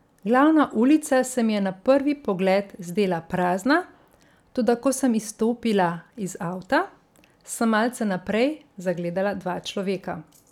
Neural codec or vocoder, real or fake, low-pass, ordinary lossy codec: none; real; 19.8 kHz; none